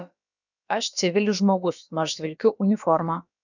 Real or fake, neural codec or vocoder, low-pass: fake; codec, 16 kHz, about 1 kbps, DyCAST, with the encoder's durations; 7.2 kHz